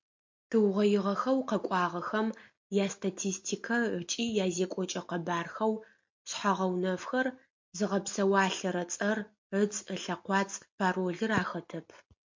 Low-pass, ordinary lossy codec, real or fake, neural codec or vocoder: 7.2 kHz; MP3, 48 kbps; real; none